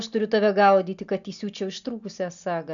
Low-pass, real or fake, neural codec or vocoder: 7.2 kHz; real; none